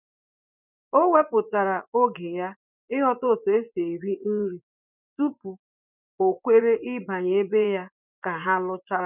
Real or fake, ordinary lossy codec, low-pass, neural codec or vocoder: fake; none; 3.6 kHz; vocoder, 24 kHz, 100 mel bands, Vocos